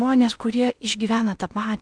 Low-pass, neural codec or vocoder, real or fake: 9.9 kHz; codec, 16 kHz in and 24 kHz out, 0.6 kbps, FocalCodec, streaming, 2048 codes; fake